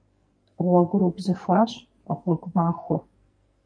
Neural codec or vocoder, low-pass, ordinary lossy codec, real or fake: codec, 44.1 kHz, 2.6 kbps, SNAC; 9.9 kHz; MP3, 48 kbps; fake